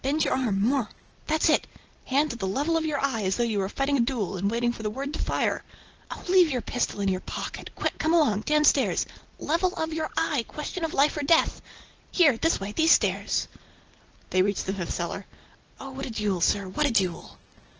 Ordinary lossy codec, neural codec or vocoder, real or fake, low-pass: Opus, 16 kbps; none; real; 7.2 kHz